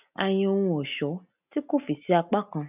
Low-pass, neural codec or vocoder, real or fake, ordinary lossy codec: 3.6 kHz; none; real; none